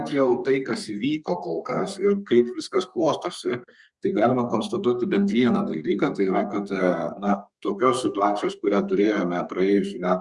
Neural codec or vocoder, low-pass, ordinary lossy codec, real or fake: codec, 32 kHz, 1.9 kbps, SNAC; 10.8 kHz; Opus, 64 kbps; fake